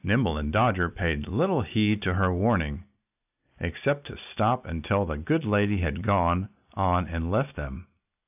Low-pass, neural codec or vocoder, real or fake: 3.6 kHz; none; real